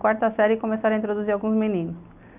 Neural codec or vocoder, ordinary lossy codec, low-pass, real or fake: none; none; 3.6 kHz; real